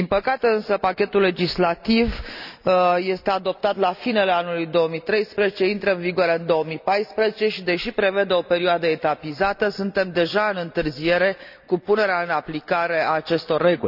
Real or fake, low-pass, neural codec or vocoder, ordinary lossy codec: real; 5.4 kHz; none; none